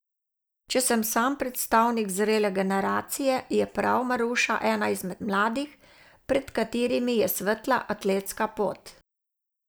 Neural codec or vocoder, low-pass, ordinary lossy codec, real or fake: none; none; none; real